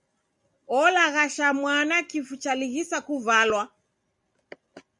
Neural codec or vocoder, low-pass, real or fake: none; 10.8 kHz; real